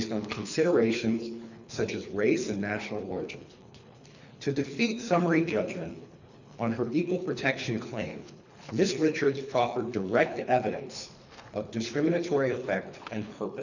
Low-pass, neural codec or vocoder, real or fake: 7.2 kHz; codec, 24 kHz, 3 kbps, HILCodec; fake